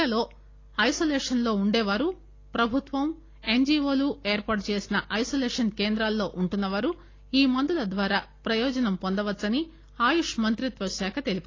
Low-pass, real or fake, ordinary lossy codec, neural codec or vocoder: 7.2 kHz; real; AAC, 32 kbps; none